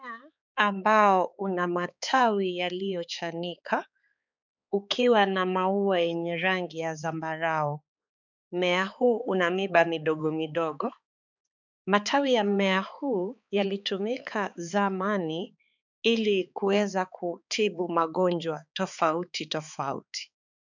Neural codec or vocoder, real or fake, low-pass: codec, 16 kHz, 4 kbps, X-Codec, HuBERT features, trained on balanced general audio; fake; 7.2 kHz